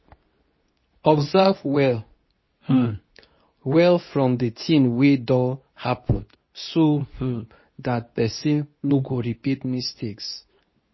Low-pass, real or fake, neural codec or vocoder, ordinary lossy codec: 7.2 kHz; fake; codec, 24 kHz, 0.9 kbps, WavTokenizer, medium speech release version 2; MP3, 24 kbps